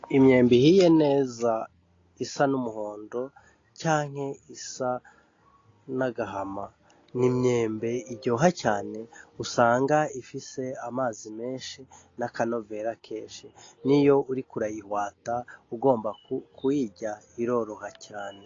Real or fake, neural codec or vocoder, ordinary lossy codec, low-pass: real; none; AAC, 32 kbps; 7.2 kHz